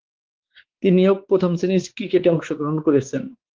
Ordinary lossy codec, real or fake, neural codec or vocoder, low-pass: Opus, 16 kbps; fake; codec, 16 kHz, 4 kbps, X-Codec, WavLM features, trained on Multilingual LibriSpeech; 7.2 kHz